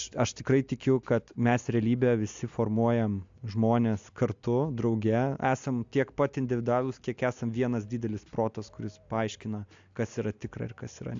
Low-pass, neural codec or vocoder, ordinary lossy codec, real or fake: 7.2 kHz; none; AAC, 64 kbps; real